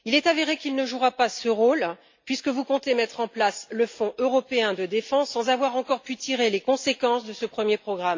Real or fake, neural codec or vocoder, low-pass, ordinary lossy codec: real; none; 7.2 kHz; MP3, 48 kbps